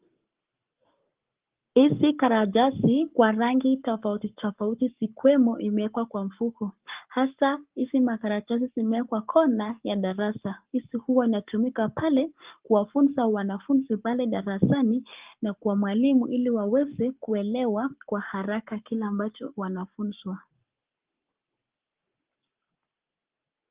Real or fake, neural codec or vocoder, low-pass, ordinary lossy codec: fake; codec, 44.1 kHz, 7.8 kbps, Pupu-Codec; 3.6 kHz; Opus, 32 kbps